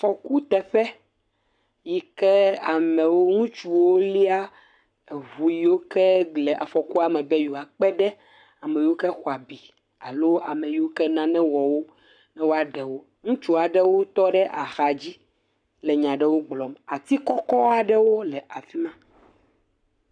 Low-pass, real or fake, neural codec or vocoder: 9.9 kHz; fake; codec, 44.1 kHz, 7.8 kbps, Pupu-Codec